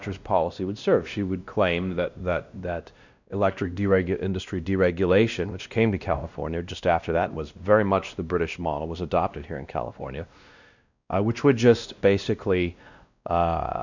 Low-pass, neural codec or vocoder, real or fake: 7.2 kHz; codec, 16 kHz, 1 kbps, X-Codec, WavLM features, trained on Multilingual LibriSpeech; fake